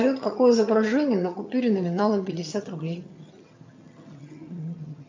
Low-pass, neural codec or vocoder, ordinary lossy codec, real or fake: 7.2 kHz; vocoder, 22.05 kHz, 80 mel bands, HiFi-GAN; MP3, 48 kbps; fake